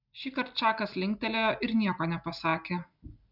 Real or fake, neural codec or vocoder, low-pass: real; none; 5.4 kHz